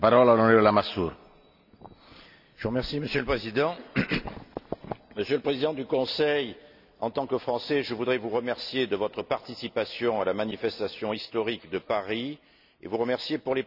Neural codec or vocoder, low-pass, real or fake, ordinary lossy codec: none; 5.4 kHz; real; none